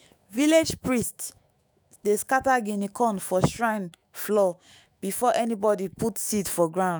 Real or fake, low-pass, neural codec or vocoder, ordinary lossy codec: fake; none; autoencoder, 48 kHz, 128 numbers a frame, DAC-VAE, trained on Japanese speech; none